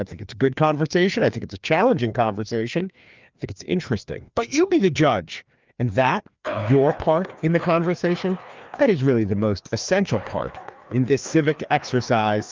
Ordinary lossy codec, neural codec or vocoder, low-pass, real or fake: Opus, 24 kbps; codec, 16 kHz, 2 kbps, FreqCodec, larger model; 7.2 kHz; fake